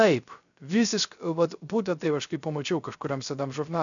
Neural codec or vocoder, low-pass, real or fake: codec, 16 kHz, 0.3 kbps, FocalCodec; 7.2 kHz; fake